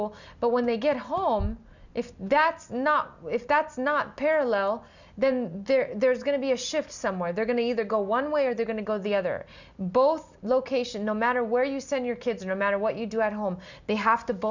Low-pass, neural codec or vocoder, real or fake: 7.2 kHz; none; real